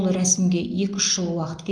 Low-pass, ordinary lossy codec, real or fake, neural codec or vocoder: 9.9 kHz; Opus, 24 kbps; real; none